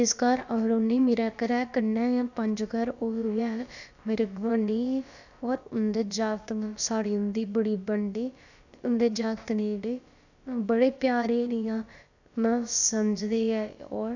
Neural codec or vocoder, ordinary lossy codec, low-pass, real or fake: codec, 16 kHz, about 1 kbps, DyCAST, with the encoder's durations; none; 7.2 kHz; fake